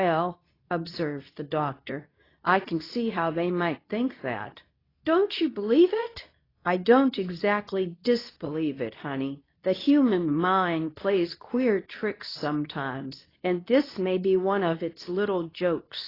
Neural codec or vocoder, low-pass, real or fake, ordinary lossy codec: codec, 24 kHz, 0.9 kbps, WavTokenizer, medium speech release version 2; 5.4 kHz; fake; AAC, 24 kbps